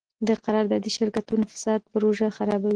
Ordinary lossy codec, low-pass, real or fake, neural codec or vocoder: Opus, 16 kbps; 9.9 kHz; real; none